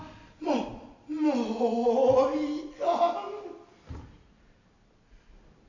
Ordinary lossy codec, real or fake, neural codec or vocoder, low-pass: none; real; none; 7.2 kHz